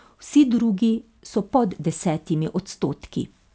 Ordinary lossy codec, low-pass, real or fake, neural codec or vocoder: none; none; real; none